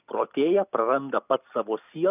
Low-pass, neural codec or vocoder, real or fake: 3.6 kHz; none; real